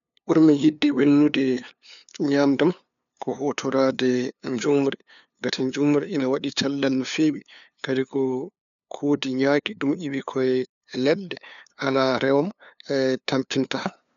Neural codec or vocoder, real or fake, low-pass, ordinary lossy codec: codec, 16 kHz, 2 kbps, FunCodec, trained on LibriTTS, 25 frames a second; fake; 7.2 kHz; none